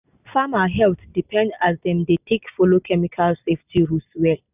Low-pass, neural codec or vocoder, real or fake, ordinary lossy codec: 3.6 kHz; none; real; none